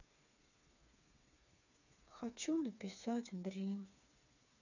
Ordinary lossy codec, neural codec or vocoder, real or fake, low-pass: none; codec, 16 kHz, 4 kbps, FreqCodec, smaller model; fake; 7.2 kHz